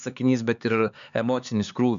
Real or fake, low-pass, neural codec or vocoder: fake; 7.2 kHz; codec, 16 kHz, 4 kbps, X-Codec, HuBERT features, trained on LibriSpeech